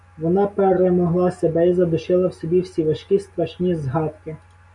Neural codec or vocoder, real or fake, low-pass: none; real; 10.8 kHz